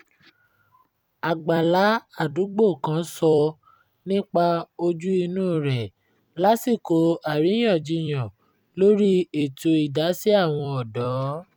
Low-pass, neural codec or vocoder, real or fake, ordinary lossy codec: 19.8 kHz; vocoder, 44.1 kHz, 128 mel bands every 256 samples, BigVGAN v2; fake; none